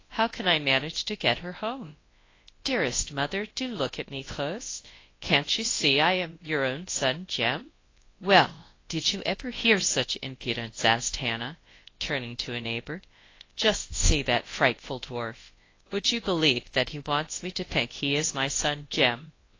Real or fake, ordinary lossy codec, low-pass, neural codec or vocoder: fake; AAC, 32 kbps; 7.2 kHz; codec, 24 kHz, 0.9 kbps, WavTokenizer, large speech release